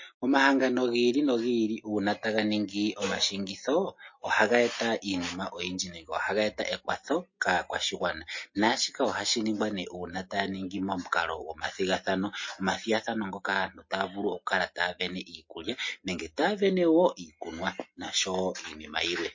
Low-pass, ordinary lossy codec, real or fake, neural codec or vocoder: 7.2 kHz; MP3, 32 kbps; real; none